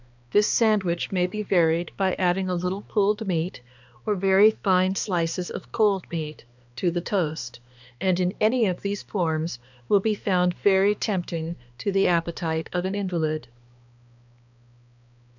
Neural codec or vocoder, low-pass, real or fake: codec, 16 kHz, 2 kbps, X-Codec, HuBERT features, trained on balanced general audio; 7.2 kHz; fake